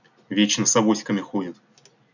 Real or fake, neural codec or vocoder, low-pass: real; none; 7.2 kHz